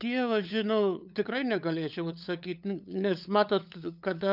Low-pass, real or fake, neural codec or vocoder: 5.4 kHz; fake; codec, 16 kHz, 8 kbps, FunCodec, trained on LibriTTS, 25 frames a second